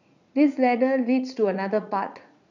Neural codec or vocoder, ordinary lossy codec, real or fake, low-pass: vocoder, 44.1 kHz, 80 mel bands, Vocos; none; fake; 7.2 kHz